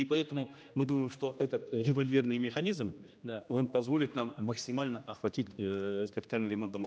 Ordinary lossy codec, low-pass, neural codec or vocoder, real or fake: none; none; codec, 16 kHz, 1 kbps, X-Codec, HuBERT features, trained on general audio; fake